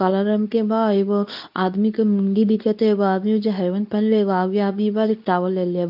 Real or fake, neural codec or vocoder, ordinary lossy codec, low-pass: fake; codec, 24 kHz, 0.9 kbps, WavTokenizer, medium speech release version 2; none; 5.4 kHz